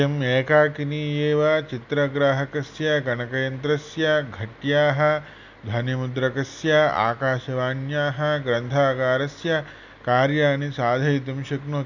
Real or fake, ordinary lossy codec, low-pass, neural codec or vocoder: real; none; 7.2 kHz; none